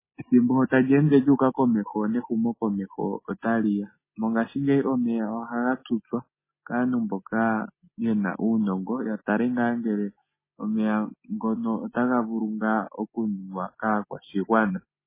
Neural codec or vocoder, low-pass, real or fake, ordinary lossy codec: none; 3.6 kHz; real; MP3, 16 kbps